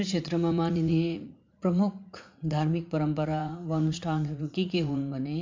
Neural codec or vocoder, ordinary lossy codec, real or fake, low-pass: vocoder, 44.1 kHz, 80 mel bands, Vocos; MP3, 64 kbps; fake; 7.2 kHz